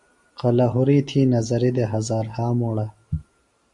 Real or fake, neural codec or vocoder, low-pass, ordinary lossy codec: real; none; 10.8 kHz; Opus, 64 kbps